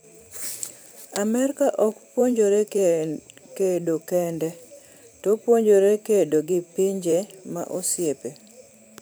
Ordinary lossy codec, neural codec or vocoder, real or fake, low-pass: none; vocoder, 44.1 kHz, 128 mel bands every 512 samples, BigVGAN v2; fake; none